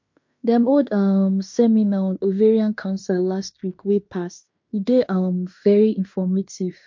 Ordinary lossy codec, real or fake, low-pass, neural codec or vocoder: MP3, 48 kbps; fake; 7.2 kHz; codec, 16 kHz in and 24 kHz out, 0.9 kbps, LongCat-Audio-Codec, fine tuned four codebook decoder